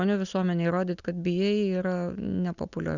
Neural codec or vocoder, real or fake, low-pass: none; real; 7.2 kHz